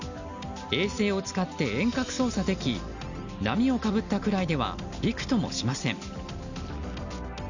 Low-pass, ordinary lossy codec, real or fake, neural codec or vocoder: 7.2 kHz; none; real; none